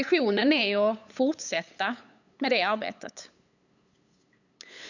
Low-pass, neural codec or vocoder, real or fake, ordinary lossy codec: 7.2 kHz; codec, 16 kHz, 8 kbps, FunCodec, trained on LibriTTS, 25 frames a second; fake; none